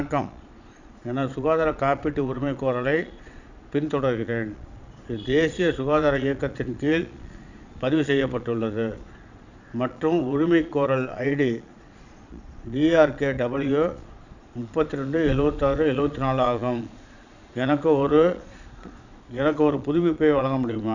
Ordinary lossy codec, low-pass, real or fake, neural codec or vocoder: none; 7.2 kHz; fake; vocoder, 22.05 kHz, 80 mel bands, Vocos